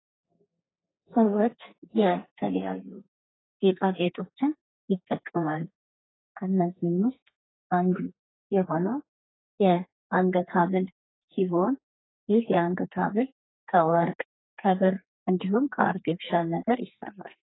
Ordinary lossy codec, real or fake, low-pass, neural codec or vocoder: AAC, 16 kbps; fake; 7.2 kHz; codec, 32 kHz, 1.9 kbps, SNAC